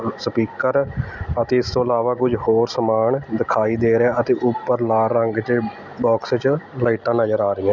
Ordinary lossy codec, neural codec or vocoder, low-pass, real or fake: none; none; 7.2 kHz; real